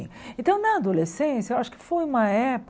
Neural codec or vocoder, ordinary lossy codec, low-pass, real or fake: none; none; none; real